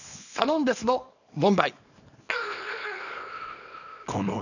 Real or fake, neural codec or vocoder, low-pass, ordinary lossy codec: fake; codec, 24 kHz, 0.9 kbps, WavTokenizer, small release; 7.2 kHz; none